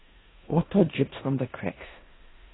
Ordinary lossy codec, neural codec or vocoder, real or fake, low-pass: AAC, 16 kbps; codec, 16 kHz in and 24 kHz out, 0.9 kbps, LongCat-Audio-Codec, four codebook decoder; fake; 7.2 kHz